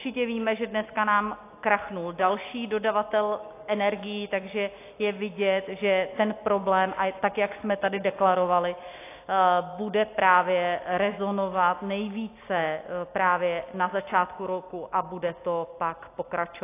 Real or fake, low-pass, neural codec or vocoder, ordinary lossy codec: real; 3.6 kHz; none; AAC, 24 kbps